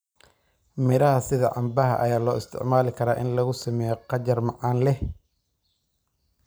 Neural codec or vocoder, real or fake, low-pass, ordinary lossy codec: none; real; none; none